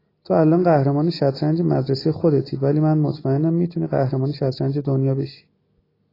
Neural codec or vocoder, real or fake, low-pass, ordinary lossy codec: none; real; 5.4 kHz; AAC, 24 kbps